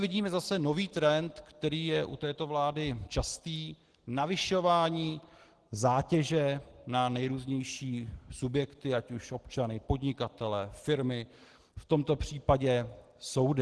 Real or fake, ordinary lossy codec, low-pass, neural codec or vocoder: real; Opus, 16 kbps; 10.8 kHz; none